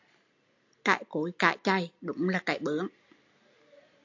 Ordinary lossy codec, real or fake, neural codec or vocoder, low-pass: AAC, 48 kbps; real; none; 7.2 kHz